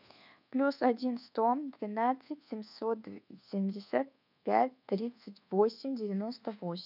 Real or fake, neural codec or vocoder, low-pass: fake; codec, 24 kHz, 1.2 kbps, DualCodec; 5.4 kHz